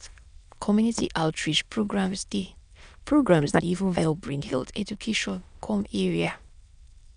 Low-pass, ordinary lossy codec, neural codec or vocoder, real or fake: 9.9 kHz; MP3, 96 kbps; autoencoder, 22.05 kHz, a latent of 192 numbers a frame, VITS, trained on many speakers; fake